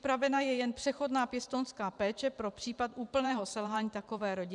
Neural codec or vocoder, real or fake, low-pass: vocoder, 44.1 kHz, 128 mel bands every 512 samples, BigVGAN v2; fake; 10.8 kHz